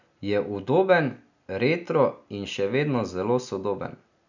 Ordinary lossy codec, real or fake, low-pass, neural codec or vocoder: none; real; 7.2 kHz; none